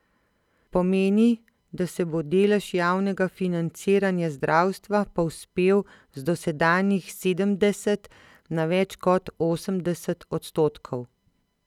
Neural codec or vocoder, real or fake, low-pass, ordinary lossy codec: none; real; 19.8 kHz; none